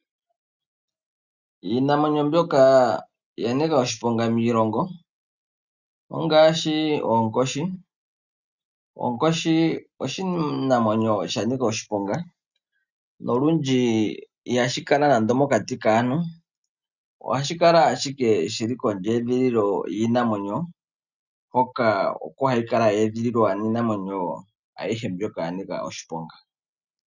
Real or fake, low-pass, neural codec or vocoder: real; 7.2 kHz; none